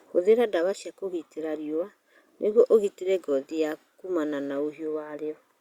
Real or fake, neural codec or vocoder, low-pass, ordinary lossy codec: real; none; 19.8 kHz; Opus, 64 kbps